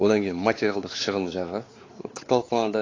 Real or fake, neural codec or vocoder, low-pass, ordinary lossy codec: fake; codec, 16 kHz, 4 kbps, X-Codec, WavLM features, trained on Multilingual LibriSpeech; 7.2 kHz; AAC, 32 kbps